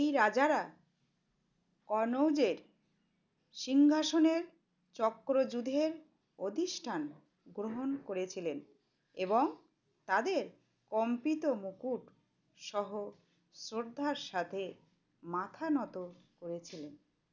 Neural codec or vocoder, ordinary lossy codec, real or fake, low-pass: none; none; real; 7.2 kHz